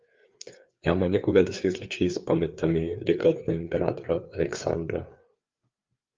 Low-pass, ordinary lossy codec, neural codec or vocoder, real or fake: 7.2 kHz; Opus, 32 kbps; codec, 16 kHz, 4 kbps, FreqCodec, larger model; fake